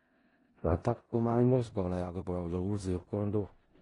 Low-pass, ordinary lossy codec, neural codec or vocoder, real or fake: 10.8 kHz; AAC, 32 kbps; codec, 16 kHz in and 24 kHz out, 0.4 kbps, LongCat-Audio-Codec, four codebook decoder; fake